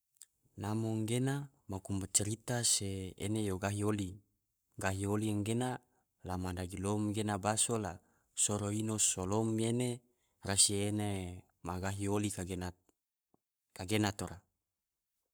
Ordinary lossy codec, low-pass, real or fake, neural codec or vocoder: none; none; fake; codec, 44.1 kHz, 7.8 kbps, Pupu-Codec